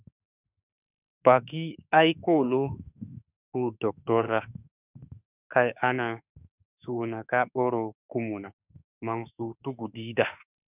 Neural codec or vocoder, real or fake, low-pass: autoencoder, 48 kHz, 32 numbers a frame, DAC-VAE, trained on Japanese speech; fake; 3.6 kHz